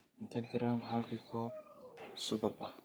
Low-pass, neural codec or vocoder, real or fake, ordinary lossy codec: none; codec, 44.1 kHz, 3.4 kbps, Pupu-Codec; fake; none